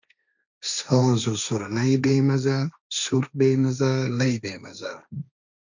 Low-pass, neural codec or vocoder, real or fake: 7.2 kHz; codec, 16 kHz, 1.1 kbps, Voila-Tokenizer; fake